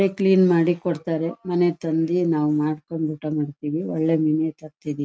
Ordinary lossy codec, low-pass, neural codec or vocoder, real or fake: none; none; none; real